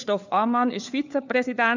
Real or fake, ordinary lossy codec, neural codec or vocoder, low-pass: fake; none; codec, 16 kHz, 4 kbps, FunCodec, trained on LibriTTS, 50 frames a second; 7.2 kHz